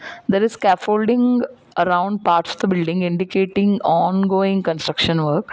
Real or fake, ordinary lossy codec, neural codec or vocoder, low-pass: real; none; none; none